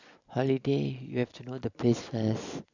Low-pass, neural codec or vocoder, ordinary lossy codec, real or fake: 7.2 kHz; none; none; real